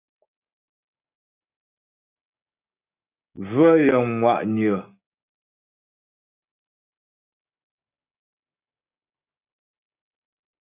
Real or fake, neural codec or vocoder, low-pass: fake; codec, 16 kHz, 6 kbps, DAC; 3.6 kHz